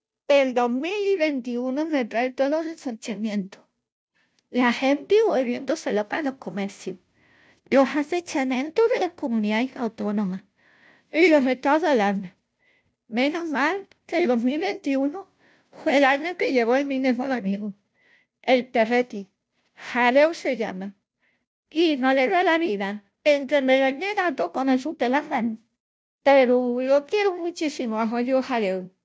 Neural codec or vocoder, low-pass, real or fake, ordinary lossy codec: codec, 16 kHz, 0.5 kbps, FunCodec, trained on Chinese and English, 25 frames a second; none; fake; none